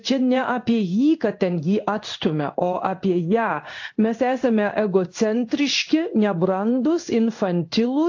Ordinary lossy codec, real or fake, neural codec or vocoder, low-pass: AAC, 48 kbps; fake; codec, 16 kHz in and 24 kHz out, 1 kbps, XY-Tokenizer; 7.2 kHz